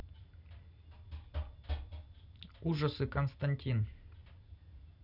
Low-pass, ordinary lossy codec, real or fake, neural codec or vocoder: 5.4 kHz; none; real; none